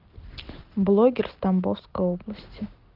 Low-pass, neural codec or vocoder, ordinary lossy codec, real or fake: 5.4 kHz; none; Opus, 16 kbps; real